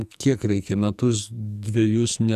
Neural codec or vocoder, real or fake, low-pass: codec, 44.1 kHz, 2.6 kbps, SNAC; fake; 14.4 kHz